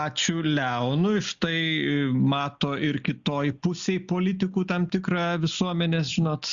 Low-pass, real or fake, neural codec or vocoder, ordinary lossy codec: 7.2 kHz; real; none; Opus, 64 kbps